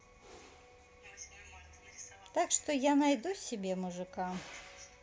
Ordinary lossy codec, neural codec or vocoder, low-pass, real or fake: none; none; none; real